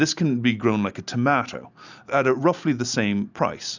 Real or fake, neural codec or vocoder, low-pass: real; none; 7.2 kHz